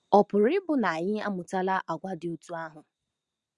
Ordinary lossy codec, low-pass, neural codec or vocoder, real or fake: Opus, 64 kbps; 10.8 kHz; none; real